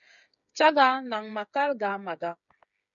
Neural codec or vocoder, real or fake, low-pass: codec, 16 kHz, 8 kbps, FreqCodec, smaller model; fake; 7.2 kHz